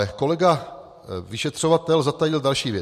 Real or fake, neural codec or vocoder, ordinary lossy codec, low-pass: real; none; MP3, 64 kbps; 14.4 kHz